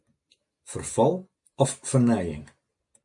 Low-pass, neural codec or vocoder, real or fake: 10.8 kHz; none; real